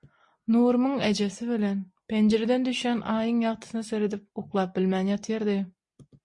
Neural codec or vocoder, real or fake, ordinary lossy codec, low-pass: none; real; MP3, 48 kbps; 10.8 kHz